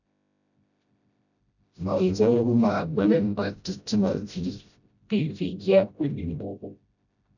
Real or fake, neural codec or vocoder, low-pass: fake; codec, 16 kHz, 0.5 kbps, FreqCodec, smaller model; 7.2 kHz